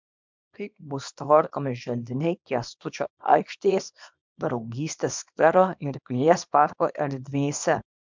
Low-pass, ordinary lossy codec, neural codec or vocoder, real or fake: 7.2 kHz; MP3, 64 kbps; codec, 24 kHz, 0.9 kbps, WavTokenizer, small release; fake